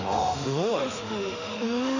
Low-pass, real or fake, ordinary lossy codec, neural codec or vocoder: 7.2 kHz; fake; none; autoencoder, 48 kHz, 32 numbers a frame, DAC-VAE, trained on Japanese speech